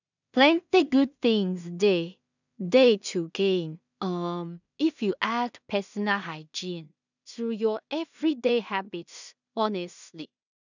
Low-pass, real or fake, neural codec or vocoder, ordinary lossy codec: 7.2 kHz; fake; codec, 16 kHz in and 24 kHz out, 0.4 kbps, LongCat-Audio-Codec, two codebook decoder; none